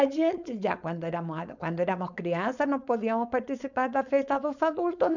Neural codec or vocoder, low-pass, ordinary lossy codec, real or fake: codec, 16 kHz, 4.8 kbps, FACodec; 7.2 kHz; none; fake